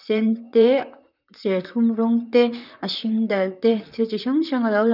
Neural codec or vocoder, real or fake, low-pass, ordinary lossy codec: vocoder, 44.1 kHz, 128 mel bands, Pupu-Vocoder; fake; 5.4 kHz; none